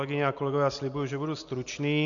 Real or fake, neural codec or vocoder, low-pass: real; none; 7.2 kHz